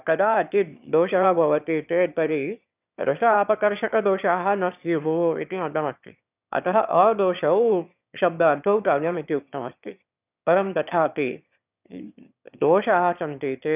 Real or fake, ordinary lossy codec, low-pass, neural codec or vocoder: fake; none; 3.6 kHz; autoencoder, 22.05 kHz, a latent of 192 numbers a frame, VITS, trained on one speaker